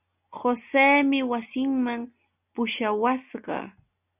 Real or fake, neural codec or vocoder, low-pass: real; none; 3.6 kHz